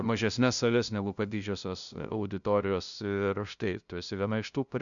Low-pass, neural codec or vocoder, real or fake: 7.2 kHz; codec, 16 kHz, 0.5 kbps, FunCodec, trained on LibriTTS, 25 frames a second; fake